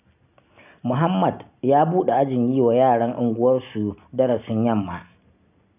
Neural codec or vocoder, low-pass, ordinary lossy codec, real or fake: none; 3.6 kHz; none; real